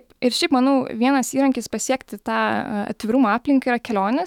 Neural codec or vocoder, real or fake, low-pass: autoencoder, 48 kHz, 128 numbers a frame, DAC-VAE, trained on Japanese speech; fake; 19.8 kHz